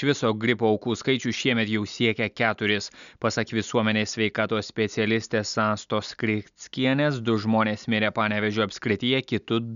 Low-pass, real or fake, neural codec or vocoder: 7.2 kHz; real; none